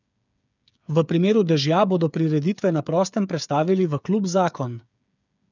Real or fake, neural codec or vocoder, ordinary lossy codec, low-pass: fake; codec, 16 kHz, 8 kbps, FreqCodec, smaller model; none; 7.2 kHz